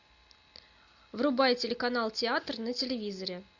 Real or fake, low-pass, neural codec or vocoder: real; 7.2 kHz; none